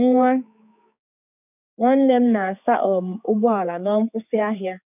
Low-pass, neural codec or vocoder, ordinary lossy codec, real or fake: 3.6 kHz; codec, 16 kHz, 4 kbps, X-Codec, HuBERT features, trained on general audio; none; fake